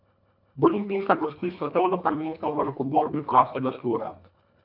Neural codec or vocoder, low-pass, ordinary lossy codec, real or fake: codec, 24 kHz, 1.5 kbps, HILCodec; 5.4 kHz; none; fake